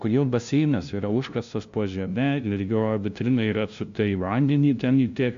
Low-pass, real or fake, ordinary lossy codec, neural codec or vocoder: 7.2 kHz; fake; AAC, 64 kbps; codec, 16 kHz, 0.5 kbps, FunCodec, trained on LibriTTS, 25 frames a second